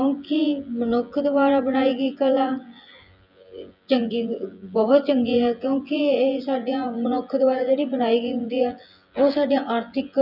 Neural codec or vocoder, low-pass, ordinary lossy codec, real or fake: vocoder, 24 kHz, 100 mel bands, Vocos; 5.4 kHz; none; fake